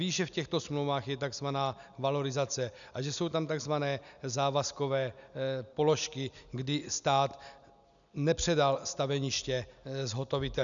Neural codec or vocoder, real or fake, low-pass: none; real; 7.2 kHz